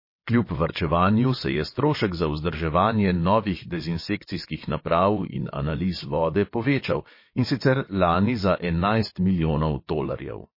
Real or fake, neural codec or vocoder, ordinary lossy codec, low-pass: fake; vocoder, 22.05 kHz, 80 mel bands, WaveNeXt; MP3, 24 kbps; 5.4 kHz